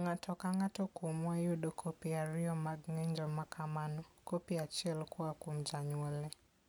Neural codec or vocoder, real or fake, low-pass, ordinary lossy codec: none; real; none; none